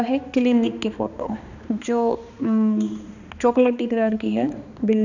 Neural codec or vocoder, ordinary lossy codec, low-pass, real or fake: codec, 16 kHz, 2 kbps, X-Codec, HuBERT features, trained on balanced general audio; none; 7.2 kHz; fake